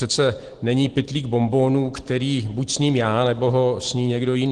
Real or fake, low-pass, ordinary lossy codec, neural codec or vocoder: real; 9.9 kHz; Opus, 16 kbps; none